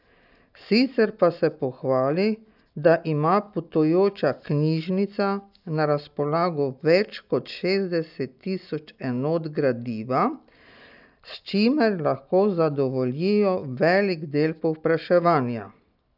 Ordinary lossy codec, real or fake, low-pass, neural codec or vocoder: none; real; 5.4 kHz; none